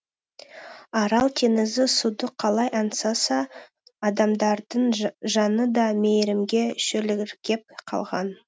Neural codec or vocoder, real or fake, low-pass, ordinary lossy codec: none; real; none; none